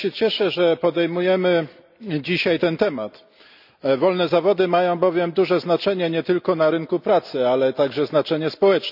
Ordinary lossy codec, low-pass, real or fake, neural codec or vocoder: none; 5.4 kHz; real; none